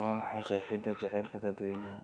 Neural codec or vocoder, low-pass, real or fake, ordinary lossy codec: autoencoder, 48 kHz, 32 numbers a frame, DAC-VAE, trained on Japanese speech; 9.9 kHz; fake; none